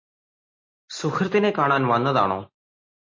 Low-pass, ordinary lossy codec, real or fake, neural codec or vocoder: 7.2 kHz; MP3, 32 kbps; real; none